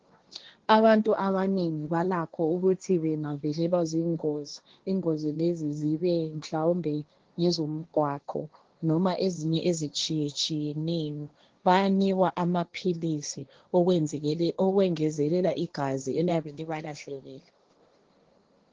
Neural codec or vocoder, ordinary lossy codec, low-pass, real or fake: codec, 16 kHz, 1.1 kbps, Voila-Tokenizer; Opus, 16 kbps; 7.2 kHz; fake